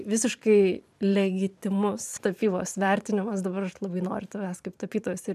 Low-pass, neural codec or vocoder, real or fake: 14.4 kHz; vocoder, 44.1 kHz, 128 mel bands every 512 samples, BigVGAN v2; fake